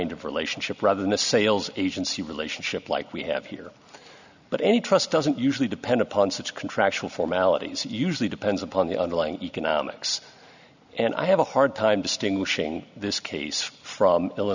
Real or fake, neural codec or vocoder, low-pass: real; none; 7.2 kHz